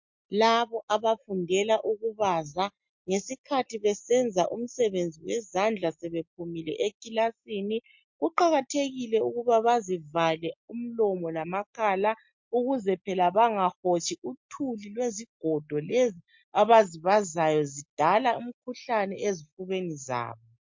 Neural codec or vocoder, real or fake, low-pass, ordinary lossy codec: none; real; 7.2 kHz; MP3, 48 kbps